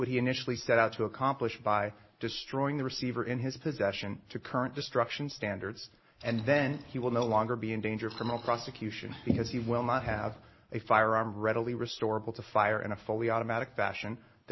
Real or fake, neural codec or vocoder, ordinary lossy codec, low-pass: real; none; MP3, 24 kbps; 7.2 kHz